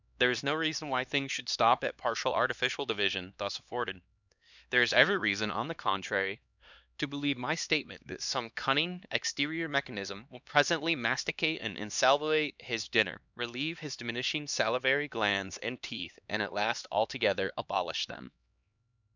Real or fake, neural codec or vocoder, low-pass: fake; codec, 16 kHz, 2 kbps, X-Codec, HuBERT features, trained on LibriSpeech; 7.2 kHz